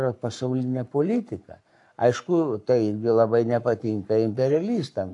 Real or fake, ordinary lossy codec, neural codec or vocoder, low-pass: fake; AAC, 64 kbps; codec, 44.1 kHz, 7.8 kbps, Pupu-Codec; 10.8 kHz